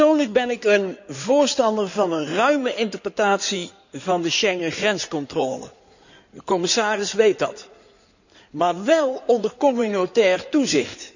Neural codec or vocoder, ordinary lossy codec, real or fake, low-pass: codec, 16 kHz in and 24 kHz out, 2.2 kbps, FireRedTTS-2 codec; none; fake; 7.2 kHz